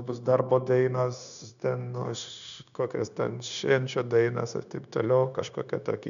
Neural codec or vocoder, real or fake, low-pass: codec, 16 kHz, 0.9 kbps, LongCat-Audio-Codec; fake; 7.2 kHz